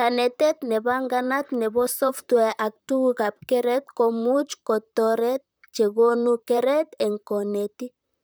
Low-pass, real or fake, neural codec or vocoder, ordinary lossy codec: none; fake; vocoder, 44.1 kHz, 128 mel bands, Pupu-Vocoder; none